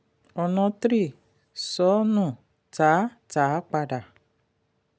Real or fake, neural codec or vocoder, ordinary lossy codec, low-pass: real; none; none; none